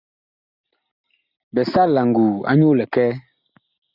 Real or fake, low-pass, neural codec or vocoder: real; 5.4 kHz; none